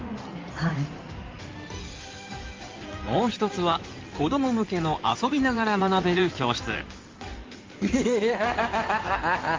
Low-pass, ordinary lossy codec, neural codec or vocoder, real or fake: 7.2 kHz; Opus, 24 kbps; codec, 16 kHz in and 24 kHz out, 2.2 kbps, FireRedTTS-2 codec; fake